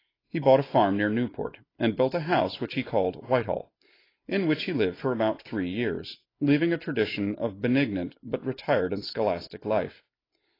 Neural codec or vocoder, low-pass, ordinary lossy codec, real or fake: none; 5.4 kHz; AAC, 24 kbps; real